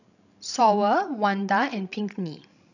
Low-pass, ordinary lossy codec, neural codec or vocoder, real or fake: 7.2 kHz; none; codec, 16 kHz, 16 kbps, FreqCodec, larger model; fake